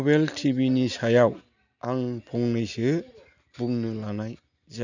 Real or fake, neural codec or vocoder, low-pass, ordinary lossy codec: real; none; 7.2 kHz; none